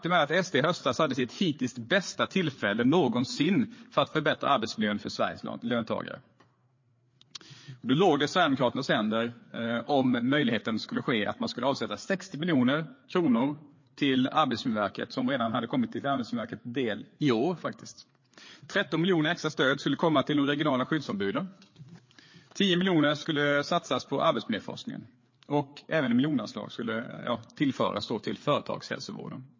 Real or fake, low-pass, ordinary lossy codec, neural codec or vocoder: fake; 7.2 kHz; MP3, 32 kbps; codec, 16 kHz, 4 kbps, FreqCodec, larger model